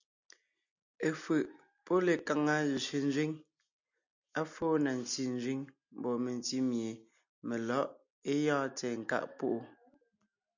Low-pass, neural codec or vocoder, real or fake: 7.2 kHz; none; real